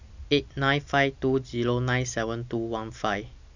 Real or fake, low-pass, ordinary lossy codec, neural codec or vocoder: real; 7.2 kHz; none; none